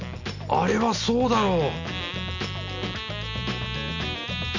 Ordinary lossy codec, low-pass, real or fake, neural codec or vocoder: none; 7.2 kHz; real; none